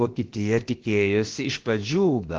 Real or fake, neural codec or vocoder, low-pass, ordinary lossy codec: fake; codec, 16 kHz, about 1 kbps, DyCAST, with the encoder's durations; 7.2 kHz; Opus, 16 kbps